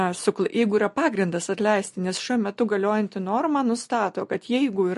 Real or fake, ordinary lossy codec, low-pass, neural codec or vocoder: real; MP3, 48 kbps; 14.4 kHz; none